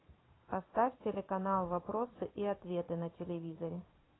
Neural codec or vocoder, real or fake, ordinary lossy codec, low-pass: vocoder, 22.05 kHz, 80 mel bands, Vocos; fake; AAC, 16 kbps; 7.2 kHz